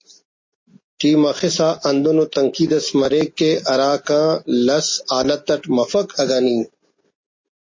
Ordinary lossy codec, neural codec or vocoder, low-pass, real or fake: MP3, 32 kbps; none; 7.2 kHz; real